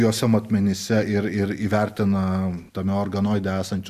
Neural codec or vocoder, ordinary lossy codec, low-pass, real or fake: none; Opus, 64 kbps; 14.4 kHz; real